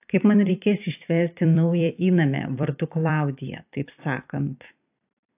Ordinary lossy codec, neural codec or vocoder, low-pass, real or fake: AAC, 32 kbps; vocoder, 44.1 kHz, 128 mel bands every 256 samples, BigVGAN v2; 3.6 kHz; fake